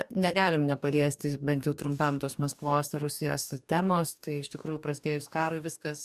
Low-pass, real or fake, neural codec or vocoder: 14.4 kHz; fake; codec, 44.1 kHz, 2.6 kbps, DAC